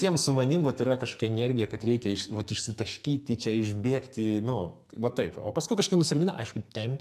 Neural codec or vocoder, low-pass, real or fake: codec, 44.1 kHz, 2.6 kbps, SNAC; 14.4 kHz; fake